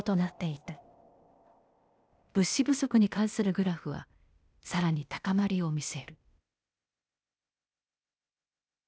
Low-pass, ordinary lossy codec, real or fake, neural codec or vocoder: none; none; fake; codec, 16 kHz, 0.8 kbps, ZipCodec